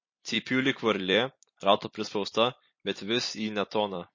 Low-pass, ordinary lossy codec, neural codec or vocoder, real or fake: 7.2 kHz; MP3, 32 kbps; vocoder, 44.1 kHz, 128 mel bands every 256 samples, BigVGAN v2; fake